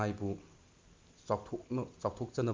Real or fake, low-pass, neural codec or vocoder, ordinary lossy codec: real; 7.2 kHz; none; Opus, 24 kbps